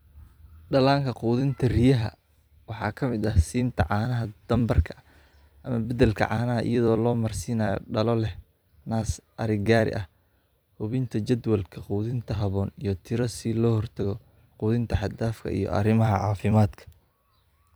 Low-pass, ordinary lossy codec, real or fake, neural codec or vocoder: none; none; fake; vocoder, 44.1 kHz, 128 mel bands every 256 samples, BigVGAN v2